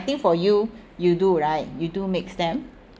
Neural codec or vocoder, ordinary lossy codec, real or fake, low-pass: none; none; real; none